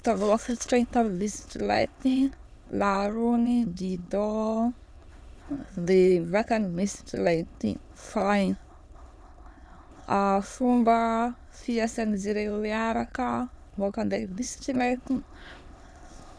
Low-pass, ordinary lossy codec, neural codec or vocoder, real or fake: none; none; autoencoder, 22.05 kHz, a latent of 192 numbers a frame, VITS, trained on many speakers; fake